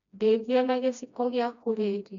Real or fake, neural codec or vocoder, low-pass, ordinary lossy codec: fake; codec, 16 kHz, 1 kbps, FreqCodec, smaller model; 7.2 kHz; none